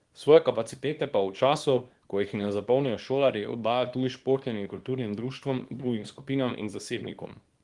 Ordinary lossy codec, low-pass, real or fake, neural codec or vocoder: Opus, 24 kbps; 10.8 kHz; fake; codec, 24 kHz, 0.9 kbps, WavTokenizer, small release